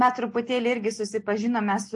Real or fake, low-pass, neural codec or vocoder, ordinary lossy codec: real; 10.8 kHz; none; AAC, 64 kbps